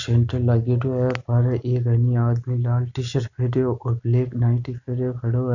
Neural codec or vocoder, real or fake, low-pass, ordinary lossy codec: none; real; 7.2 kHz; none